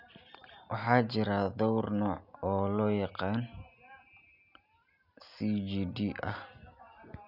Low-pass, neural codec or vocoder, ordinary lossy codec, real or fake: 5.4 kHz; none; none; real